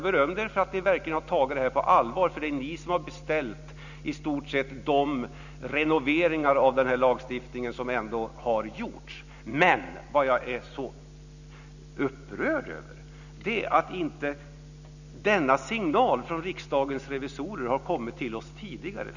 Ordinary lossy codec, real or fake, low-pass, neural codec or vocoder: none; real; 7.2 kHz; none